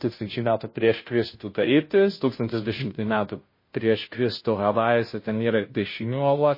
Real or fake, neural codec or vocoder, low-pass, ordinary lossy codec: fake; codec, 16 kHz, 0.5 kbps, FunCodec, trained on Chinese and English, 25 frames a second; 5.4 kHz; MP3, 24 kbps